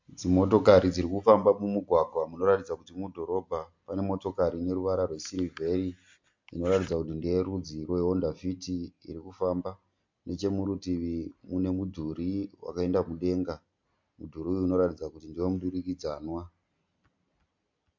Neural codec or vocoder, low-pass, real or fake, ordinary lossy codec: none; 7.2 kHz; real; MP3, 48 kbps